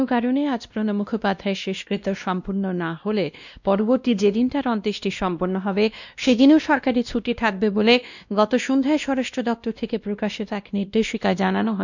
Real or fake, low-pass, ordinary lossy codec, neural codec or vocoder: fake; 7.2 kHz; none; codec, 16 kHz, 1 kbps, X-Codec, WavLM features, trained on Multilingual LibriSpeech